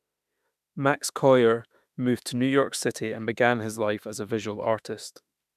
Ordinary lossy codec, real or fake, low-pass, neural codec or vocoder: none; fake; 14.4 kHz; autoencoder, 48 kHz, 32 numbers a frame, DAC-VAE, trained on Japanese speech